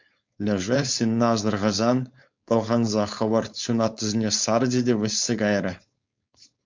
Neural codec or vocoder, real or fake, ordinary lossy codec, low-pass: codec, 16 kHz, 4.8 kbps, FACodec; fake; MP3, 64 kbps; 7.2 kHz